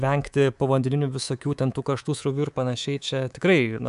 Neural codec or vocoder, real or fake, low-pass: codec, 24 kHz, 3.1 kbps, DualCodec; fake; 10.8 kHz